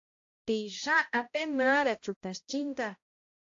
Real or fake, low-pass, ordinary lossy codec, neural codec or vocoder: fake; 7.2 kHz; AAC, 48 kbps; codec, 16 kHz, 0.5 kbps, X-Codec, HuBERT features, trained on balanced general audio